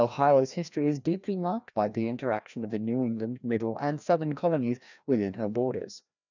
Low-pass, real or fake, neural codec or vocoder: 7.2 kHz; fake; codec, 16 kHz, 1 kbps, FreqCodec, larger model